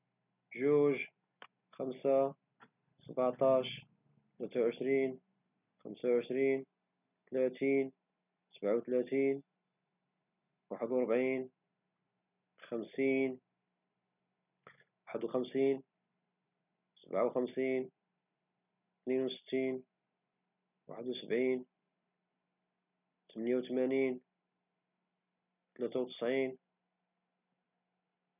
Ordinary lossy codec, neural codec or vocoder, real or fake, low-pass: none; none; real; 3.6 kHz